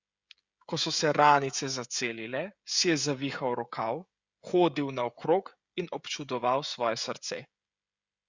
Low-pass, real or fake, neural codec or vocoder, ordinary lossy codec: 7.2 kHz; fake; codec, 16 kHz, 16 kbps, FreqCodec, smaller model; Opus, 64 kbps